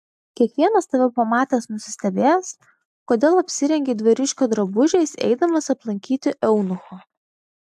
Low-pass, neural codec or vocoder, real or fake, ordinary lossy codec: 14.4 kHz; none; real; AAC, 96 kbps